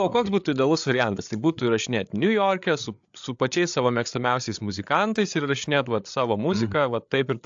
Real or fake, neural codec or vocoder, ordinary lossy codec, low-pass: fake; codec, 16 kHz, 8 kbps, FreqCodec, larger model; AAC, 64 kbps; 7.2 kHz